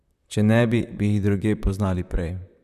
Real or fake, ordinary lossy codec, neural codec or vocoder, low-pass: fake; none; vocoder, 44.1 kHz, 128 mel bands, Pupu-Vocoder; 14.4 kHz